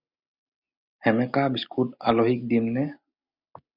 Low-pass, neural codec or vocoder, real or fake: 5.4 kHz; none; real